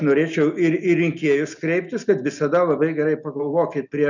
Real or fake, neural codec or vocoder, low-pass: real; none; 7.2 kHz